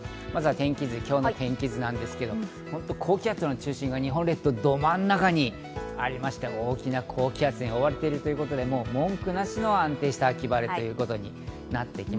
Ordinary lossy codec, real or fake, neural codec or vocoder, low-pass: none; real; none; none